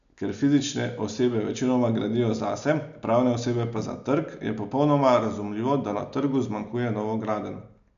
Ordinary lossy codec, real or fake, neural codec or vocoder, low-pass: none; real; none; 7.2 kHz